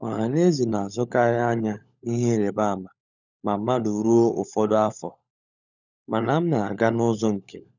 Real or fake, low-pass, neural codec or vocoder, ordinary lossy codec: fake; 7.2 kHz; codec, 16 kHz, 16 kbps, FunCodec, trained on LibriTTS, 50 frames a second; none